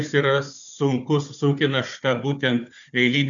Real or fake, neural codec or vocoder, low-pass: fake; codec, 16 kHz, 4 kbps, FunCodec, trained on Chinese and English, 50 frames a second; 7.2 kHz